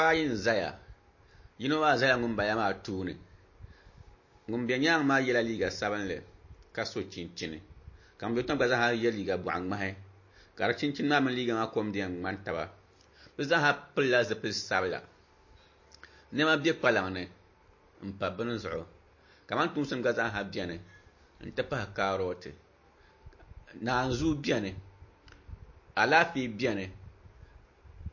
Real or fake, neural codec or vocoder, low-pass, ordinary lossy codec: real; none; 7.2 kHz; MP3, 32 kbps